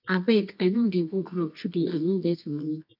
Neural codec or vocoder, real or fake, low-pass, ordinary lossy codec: codec, 24 kHz, 0.9 kbps, WavTokenizer, medium music audio release; fake; 5.4 kHz; none